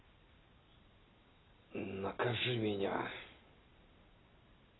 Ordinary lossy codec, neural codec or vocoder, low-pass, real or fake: AAC, 16 kbps; none; 7.2 kHz; real